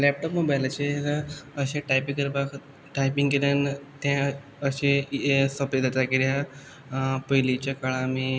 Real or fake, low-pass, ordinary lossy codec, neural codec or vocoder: real; none; none; none